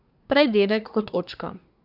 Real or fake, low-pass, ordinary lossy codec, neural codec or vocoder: fake; 5.4 kHz; none; codec, 24 kHz, 1 kbps, SNAC